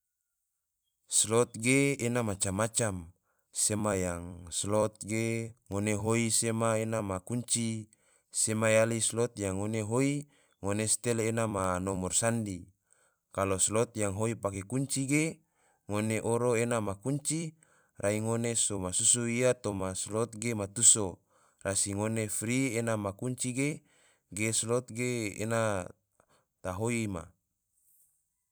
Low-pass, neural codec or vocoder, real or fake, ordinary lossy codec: none; vocoder, 44.1 kHz, 128 mel bands every 256 samples, BigVGAN v2; fake; none